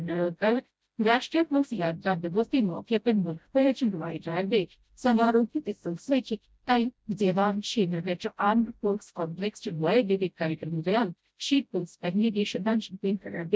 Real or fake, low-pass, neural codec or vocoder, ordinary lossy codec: fake; none; codec, 16 kHz, 0.5 kbps, FreqCodec, smaller model; none